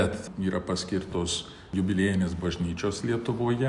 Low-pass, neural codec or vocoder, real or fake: 10.8 kHz; none; real